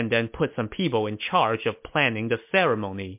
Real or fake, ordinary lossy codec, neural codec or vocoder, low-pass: real; MP3, 32 kbps; none; 3.6 kHz